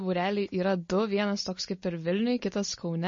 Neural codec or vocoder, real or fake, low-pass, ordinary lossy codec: none; real; 7.2 kHz; MP3, 32 kbps